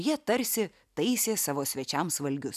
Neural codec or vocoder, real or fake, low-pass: none; real; 14.4 kHz